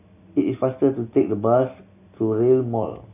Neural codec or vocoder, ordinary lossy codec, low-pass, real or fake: none; AAC, 24 kbps; 3.6 kHz; real